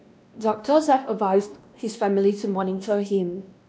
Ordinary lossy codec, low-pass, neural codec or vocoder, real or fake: none; none; codec, 16 kHz, 1 kbps, X-Codec, WavLM features, trained on Multilingual LibriSpeech; fake